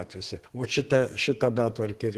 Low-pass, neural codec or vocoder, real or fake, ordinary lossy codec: 14.4 kHz; codec, 32 kHz, 1.9 kbps, SNAC; fake; Opus, 16 kbps